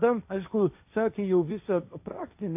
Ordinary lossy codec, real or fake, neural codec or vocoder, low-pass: AAC, 32 kbps; fake; codec, 16 kHz, 1.1 kbps, Voila-Tokenizer; 3.6 kHz